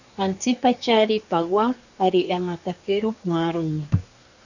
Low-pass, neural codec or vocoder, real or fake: 7.2 kHz; codec, 24 kHz, 1 kbps, SNAC; fake